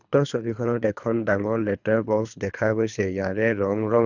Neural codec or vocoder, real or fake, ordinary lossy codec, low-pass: codec, 24 kHz, 3 kbps, HILCodec; fake; none; 7.2 kHz